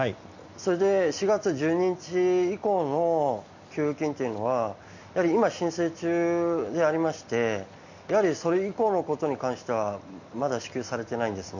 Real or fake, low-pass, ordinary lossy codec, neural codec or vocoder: real; 7.2 kHz; none; none